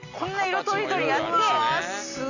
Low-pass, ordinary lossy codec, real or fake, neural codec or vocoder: 7.2 kHz; none; real; none